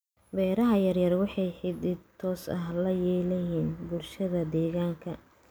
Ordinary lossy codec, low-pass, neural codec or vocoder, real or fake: none; none; none; real